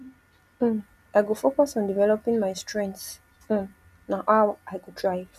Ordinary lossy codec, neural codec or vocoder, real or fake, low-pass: none; none; real; 14.4 kHz